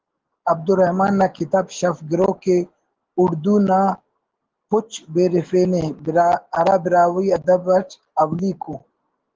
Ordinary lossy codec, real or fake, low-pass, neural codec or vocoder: Opus, 16 kbps; real; 7.2 kHz; none